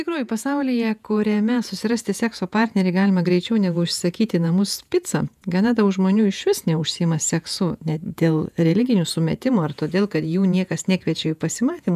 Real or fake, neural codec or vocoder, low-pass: fake; vocoder, 48 kHz, 128 mel bands, Vocos; 14.4 kHz